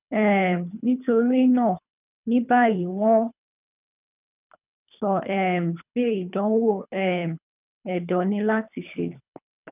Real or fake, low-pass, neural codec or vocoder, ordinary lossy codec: fake; 3.6 kHz; codec, 24 kHz, 3 kbps, HILCodec; none